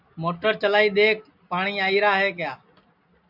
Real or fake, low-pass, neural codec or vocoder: real; 5.4 kHz; none